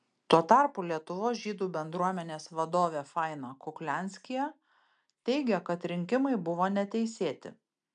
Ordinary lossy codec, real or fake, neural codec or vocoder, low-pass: MP3, 96 kbps; real; none; 9.9 kHz